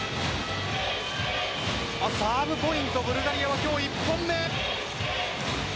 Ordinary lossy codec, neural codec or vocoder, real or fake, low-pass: none; none; real; none